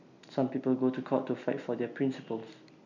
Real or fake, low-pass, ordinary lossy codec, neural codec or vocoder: real; 7.2 kHz; none; none